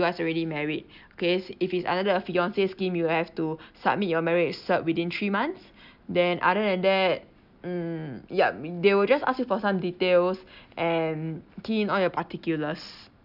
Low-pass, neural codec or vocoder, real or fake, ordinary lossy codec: 5.4 kHz; none; real; none